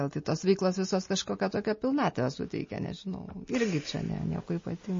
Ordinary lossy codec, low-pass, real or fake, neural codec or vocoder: MP3, 32 kbps; 7.2 kHz; real; none